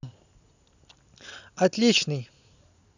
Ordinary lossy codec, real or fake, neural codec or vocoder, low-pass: none; real; none; 7.2 kHz